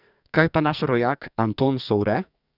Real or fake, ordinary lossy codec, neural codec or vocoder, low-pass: fake; none; codec, 44.1 kHz, 2.6 kbps, SNAC; 5.4 kHz